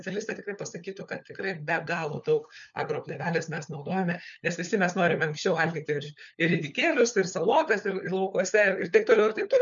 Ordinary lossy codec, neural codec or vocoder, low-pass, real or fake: MP3, 96 kbps; codec, 16 kHz, 4 kbps, FunCodec, trained on LibriTTS, 50 frames a second; 7.2 kHz; fake